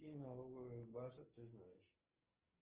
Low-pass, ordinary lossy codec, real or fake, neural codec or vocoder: 3.6 kHz; Opus, 16 kbps; fake; codec, 16 kHz, 6 kbps, DAC